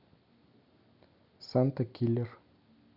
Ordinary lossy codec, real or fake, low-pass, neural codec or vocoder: none; real; 5.4 kHz; none